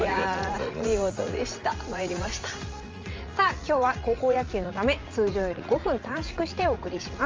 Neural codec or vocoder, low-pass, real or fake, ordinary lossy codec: vocoder, 44.1 kHz, 80 mel bands, Vocos; 7.2 kHz; fake; Opus, 32 kbps